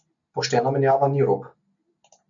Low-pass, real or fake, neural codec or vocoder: 7.2 kHz; real; none